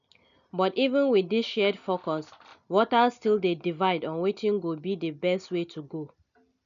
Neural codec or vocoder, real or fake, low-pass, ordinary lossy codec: none; real; 7.2 kHz; none